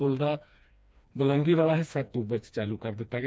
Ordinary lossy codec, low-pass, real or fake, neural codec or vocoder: none; none; fake; codec, 16 kHz, 2 kbps, FreqCodec, smaller model